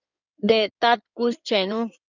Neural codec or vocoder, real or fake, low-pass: codec, 16 kHz in and 24 kHz out, 2.2 kbps, FireRedTTS-2 codec; fake; 7.2 kHz